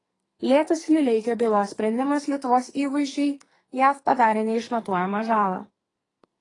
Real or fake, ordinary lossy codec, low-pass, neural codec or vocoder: fake; AAC, 32 kbps; 10.8 kHz; codec, 44.1 kHz, 2.6 kbps, SNAC